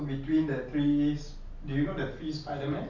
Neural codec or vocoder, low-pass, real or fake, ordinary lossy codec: none; 7.2 kHz; real; none